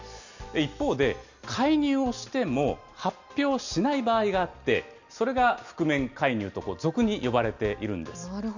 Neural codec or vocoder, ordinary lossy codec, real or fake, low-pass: none; none; real; 7.2 kHz